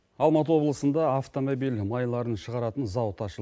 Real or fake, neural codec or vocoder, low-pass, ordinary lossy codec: real; none; none; none